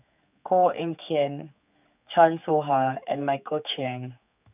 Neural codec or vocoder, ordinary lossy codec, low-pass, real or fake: codec, 16 kHz, 4 kbps, X-Codec, HuBERT features, trained on general audio; none; 3.6 kHz; fake